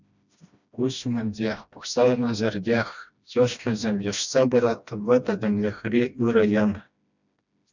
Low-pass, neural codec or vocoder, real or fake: 7.2 kHz; codec, 16 kHz, 1 kbps, FreqCodec, smaller model; fake